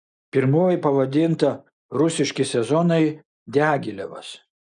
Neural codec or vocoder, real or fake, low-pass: none; real; 9.9 kHz